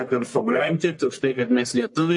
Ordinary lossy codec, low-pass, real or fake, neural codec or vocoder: MP3, 48 kbps; 10.8 kHz; fake; codec, 44.1 kHz, 1.7 kbps, Pupu-Codec